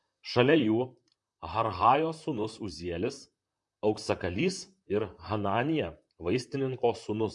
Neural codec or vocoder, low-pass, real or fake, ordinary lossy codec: vocoder, 44.1 kHz, 128 mel bands, Pupu-Vocoder; 10.8 kHz; fake; MP3, 64 kbps